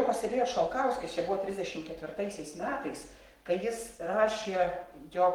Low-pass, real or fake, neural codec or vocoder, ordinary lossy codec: 19.8 kHz; fake; codec, 44.1 kHz, 7.8 kbps, Pupu-Codec; Opus, 24 kbps